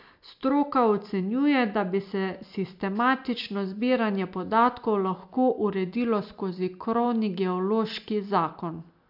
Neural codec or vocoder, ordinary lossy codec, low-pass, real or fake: none; MP3, 48 kbps; 5.4 kHz; real